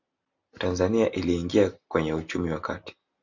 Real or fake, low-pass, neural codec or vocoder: real; 7.2 kHz; none